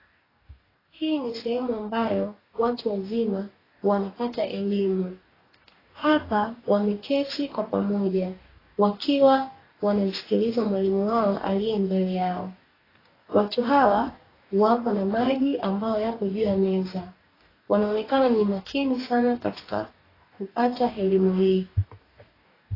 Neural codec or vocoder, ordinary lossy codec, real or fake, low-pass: codec, 44.1 kHz, 2.6 kbps, DAC; AAC, 24 kbps; fake; 5.4 kHz